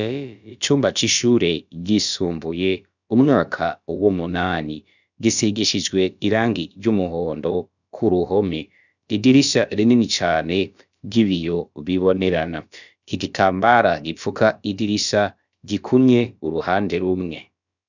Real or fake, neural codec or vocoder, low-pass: fake; codec, 16 kHz, about 1 kbps, DyCAST, with the encoder's durations; 7.2 kHz